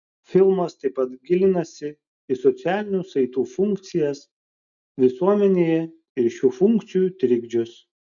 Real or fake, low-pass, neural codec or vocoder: real; 7.2 kHz; none